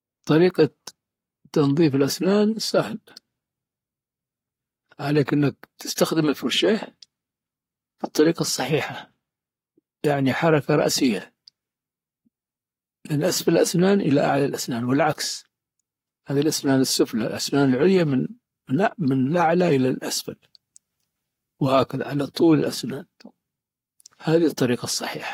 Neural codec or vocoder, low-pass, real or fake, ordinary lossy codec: codec, 44.1 kHz, 7.8 kbps, Pupu-Codec; 19.8 kHz; fake; AAC, 48 kbps